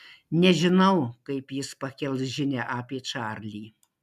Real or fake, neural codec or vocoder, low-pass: real; none; 14.4 kHz